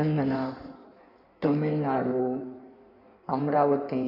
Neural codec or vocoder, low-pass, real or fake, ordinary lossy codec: codec, 16 kHz in and 24 kHz out, 1.1 kbps, FireRedTTS-2 codec; 5.4 kHz; fake; AAC, 32 kbps